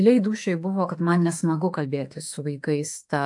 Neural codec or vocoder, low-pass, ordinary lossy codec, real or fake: autoencoder, 48 kHz, 32 numbers a frame, DAC-VAE, trained on Japanese speech; 10.8 kHz; AAC, 64 kbps; fake